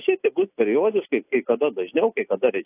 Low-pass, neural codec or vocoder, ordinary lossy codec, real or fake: 3.6 kHz; none; AAC, 24 kbps; real